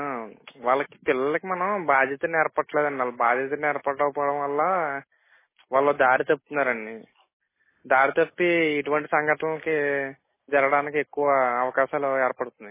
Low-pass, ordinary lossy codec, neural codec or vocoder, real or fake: 3.6 kHz; MP3, 16 kbps; none; real